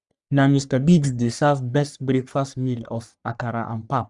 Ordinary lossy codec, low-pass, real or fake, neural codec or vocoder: none; 10.8 kHz; fake; codec, 44.1 kHz, 3.4 kbps, Pupu-Codec